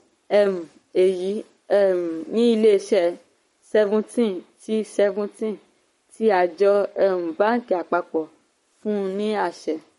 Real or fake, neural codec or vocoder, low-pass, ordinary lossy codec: fake; codec, 44.1 kHz, 7.8 kbps, Pupu-Codec; 19.8 kHz; MP3, 48 kbps